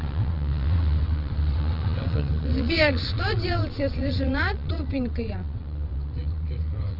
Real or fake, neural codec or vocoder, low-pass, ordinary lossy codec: fake; vocoder, 22.05 kHz, 80 mel bands, WaveNeXt; 5.4 kHz; none